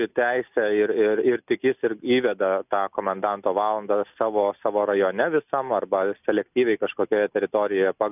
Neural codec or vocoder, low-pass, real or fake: none; 3.6 kHz; real